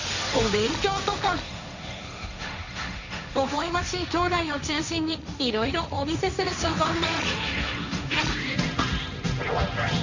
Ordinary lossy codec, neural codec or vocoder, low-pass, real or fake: none; codec, 16 kHz, 1.1 kbps, Voila-Tokenizer; 7.2 kHz; fake